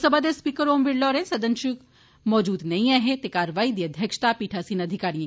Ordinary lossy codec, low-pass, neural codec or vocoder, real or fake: none; none; none; real